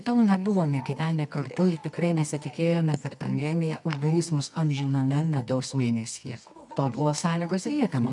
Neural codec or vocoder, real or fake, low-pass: codec, 24 kHz, 0.9 kbps, WavTokenizer, medium music audio release; fake; 10.8 kHz